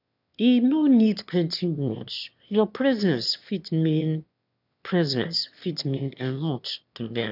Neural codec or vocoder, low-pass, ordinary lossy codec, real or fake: autoencoder, 22.05 kHz, a latent of 192 numbers a frame, VITS, trained on one speaker; 5.4 kHz; none; fake